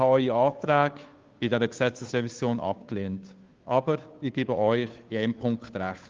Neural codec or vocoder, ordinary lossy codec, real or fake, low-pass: codec, 16 kHz, 2 kbps, FunCodec, trained on Chinese and English, 25 frames a second; Opus, 32 kbps; fake; 7.2 kHz